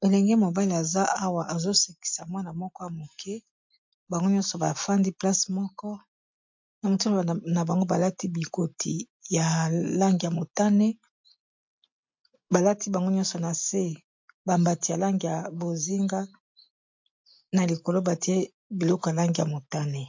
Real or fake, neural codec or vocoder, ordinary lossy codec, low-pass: real; none; MP3, 48 kbps; 7.2 kHz